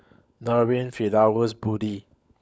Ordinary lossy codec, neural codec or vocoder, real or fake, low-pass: none; codec, 16 kHz, 16 kbps, FunCodec, trained on LibriTTS, 50 frames a second; fake; none